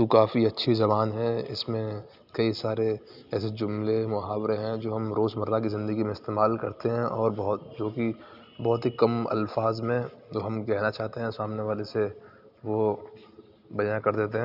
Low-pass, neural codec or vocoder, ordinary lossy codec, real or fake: 5.4 kHz; none; none; real